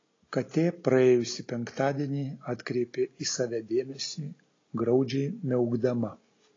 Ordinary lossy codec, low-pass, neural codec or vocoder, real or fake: AAC, 32 kbps; 7.2 kHz; none; real